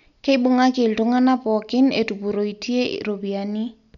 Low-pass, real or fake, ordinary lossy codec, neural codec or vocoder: 7.2 kHz; real; none; none